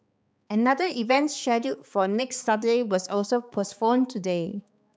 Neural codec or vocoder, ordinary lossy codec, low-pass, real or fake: codec, 16 kHz, 4 kbps, X-Codec, HuBERT features, trained on balanced general audio; none; none; fake